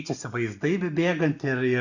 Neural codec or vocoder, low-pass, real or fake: none; 7.2 kHz; real